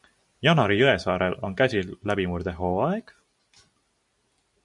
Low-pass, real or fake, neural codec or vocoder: 10.8 kHz; real; none